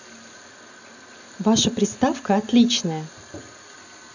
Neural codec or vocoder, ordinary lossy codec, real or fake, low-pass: none; none; real; 7.2 kHz